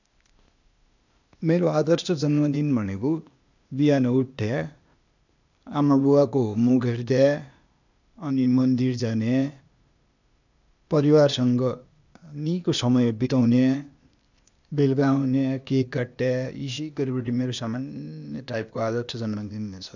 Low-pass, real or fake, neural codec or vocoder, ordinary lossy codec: 7.2 kHz; fake; codec, 16 kHz, 0.8 kbps, ZipCodec; none